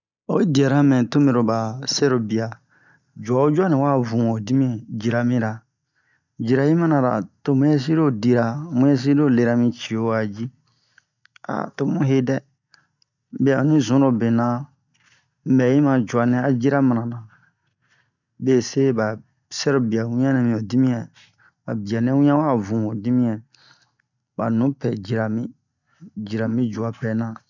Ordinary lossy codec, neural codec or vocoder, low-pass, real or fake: none; none; 7.2 kHz; real